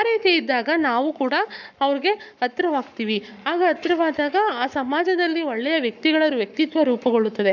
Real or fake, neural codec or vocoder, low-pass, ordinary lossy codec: fake; codec, 44.1 kHz, 7.8 kbps, Pupu-Codec; 7.2 kHz; none